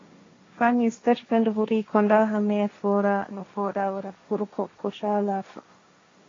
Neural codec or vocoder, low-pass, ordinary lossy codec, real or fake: codec, 16 kHz, 1.1 kbps, Voila-Tokenizer; 7.2 kHz; AAC, 32 kbps; fake